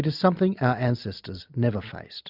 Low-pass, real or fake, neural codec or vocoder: 5.4 kHz; real; none